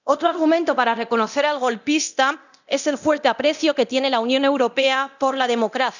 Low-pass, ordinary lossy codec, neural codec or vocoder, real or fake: 7.2 kHz; none; codec, 24 kHz, 0.9 kbps, DualCodec; fake